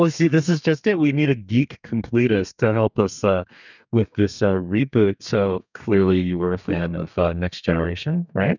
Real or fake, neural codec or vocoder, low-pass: fake; codec, 32 kHz, 1.9 kbps, SNAC; 7.2 kHz